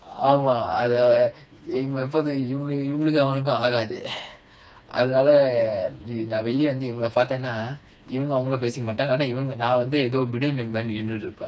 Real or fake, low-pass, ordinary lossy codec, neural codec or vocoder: fake; none; none; codec, 16 kHz, 2 kbps, FreqCodec, smaller model